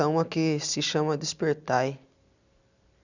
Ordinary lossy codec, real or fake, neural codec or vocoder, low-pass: none; real; none; 7.2 kHz